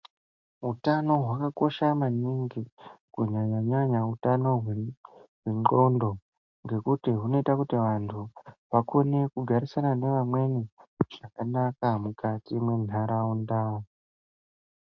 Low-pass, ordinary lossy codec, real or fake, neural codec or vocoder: 7.2 kHz; MP3, 48 kbps; real; none